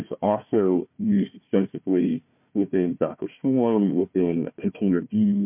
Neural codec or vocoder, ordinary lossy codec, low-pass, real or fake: codec, 16 kHz, 1 kbps, FunCodec, trained on Chinese and English, 50 frames a second; MP3, 32 kbps; 3.6 kHz; fake